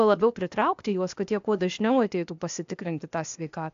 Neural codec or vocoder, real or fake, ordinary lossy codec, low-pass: codec, 16 kHz, 0.8 kbps, ZipCodec; fake; AAC, 64 kbps; 7.2 kHz